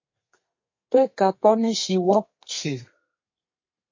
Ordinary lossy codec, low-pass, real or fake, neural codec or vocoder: MP3, 32 kbps; 7.2 kHz; fake; codec, 32 kHz, 1.9 kbps, SNAC